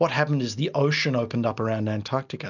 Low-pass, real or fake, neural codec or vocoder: 7.2 kHz; real; none